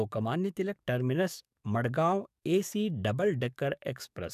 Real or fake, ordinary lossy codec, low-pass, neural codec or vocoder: fake; none; 14.4 kHz; codec, 44.1 kHz, 7.8 kbps, DAC